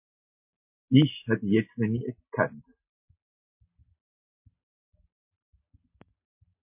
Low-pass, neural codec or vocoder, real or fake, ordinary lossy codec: 3.6 kHz; none; real; MP3, 32 kbps